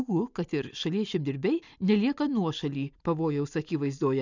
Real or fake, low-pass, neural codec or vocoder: real; 7.2 kHz; none